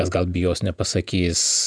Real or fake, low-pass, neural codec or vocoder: fake; 9.9 kHz; vocoder, 48 kHz, 128 mel bands, Vocos